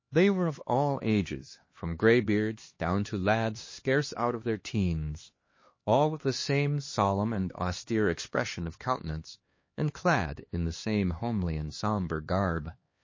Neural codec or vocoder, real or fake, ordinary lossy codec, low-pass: codec, 16 kHz, 2 kbps, X-Codec, HuBERT features, trained on LibriSpeech; fake; MP3, 32 kbps; 7.2 kHz